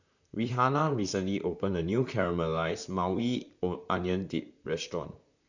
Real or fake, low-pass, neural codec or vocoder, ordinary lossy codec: fake; 7.2 kHz; vocoder, 44.1 kHz, 128 mel bands, Pupu-Vocoder; none